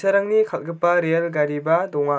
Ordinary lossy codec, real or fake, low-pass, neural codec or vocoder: none; real; none; none